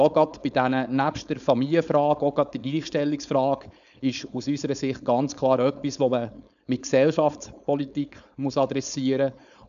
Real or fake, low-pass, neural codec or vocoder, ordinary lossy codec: fake; 7.2 kHz; codec, 16 kHz, 4.8 kbps, FACodec; none